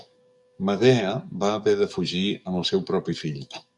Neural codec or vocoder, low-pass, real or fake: codec, 44.1 kHz, 7.8 kbps, DAC; 10.8 kHz; fake